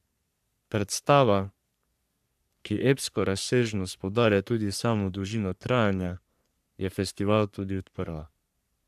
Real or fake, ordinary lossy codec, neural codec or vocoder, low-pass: fake; AAC, 96 kbps; codec, 44.1 kHz, 3.4 kbps, Pupu-Codec; 14.4 kHz